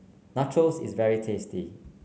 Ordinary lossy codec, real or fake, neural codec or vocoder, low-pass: none; real; none; none